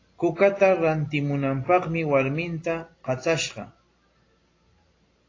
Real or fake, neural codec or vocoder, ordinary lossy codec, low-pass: real; none; AAC, 32 kbps; 7.2 kHz